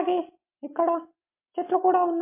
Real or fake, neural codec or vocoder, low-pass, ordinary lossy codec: real; none; 3.6 kHz; MP3, 24 kbps